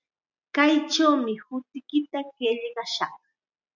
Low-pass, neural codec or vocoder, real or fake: 7.2 kHz; none; real